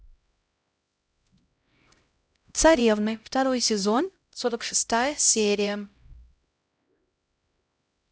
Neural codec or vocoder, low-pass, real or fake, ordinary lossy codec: codec, 16 kHz, 0.5 kbps, X-Codec, HuBERT features, trained on LibriSpeech; none; fake; none